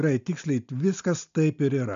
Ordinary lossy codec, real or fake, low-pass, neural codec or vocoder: MP3, 64 kbps; real; 7.2 kHz; none